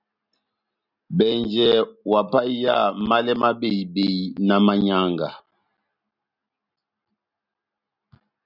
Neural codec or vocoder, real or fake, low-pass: none; real; 5.4 kHz